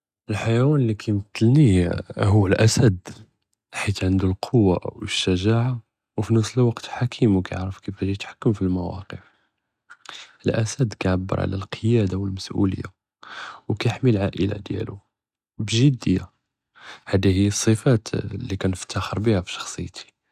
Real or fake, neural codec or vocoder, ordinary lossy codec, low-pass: real; none; none; 14.4 kHz